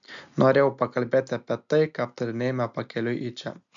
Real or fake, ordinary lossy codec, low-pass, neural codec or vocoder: real; MP3, 64 kbps; 7.2 kHz; none